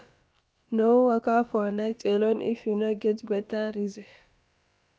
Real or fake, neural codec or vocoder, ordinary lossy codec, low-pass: fake; codec, 16 kHz, about 1 kbps, DyCAST, with the encoder's durations; none; none